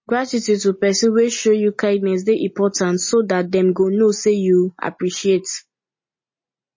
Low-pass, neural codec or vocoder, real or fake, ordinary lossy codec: 7.2 kHz; none; real; MP3, 32 kbps